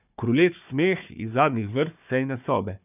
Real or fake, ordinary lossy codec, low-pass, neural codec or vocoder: fake; none; 3.6 kHz; codec, 16 kHz, 4 kbps, FunCodec, trained on Chinese and English, 50 frames a second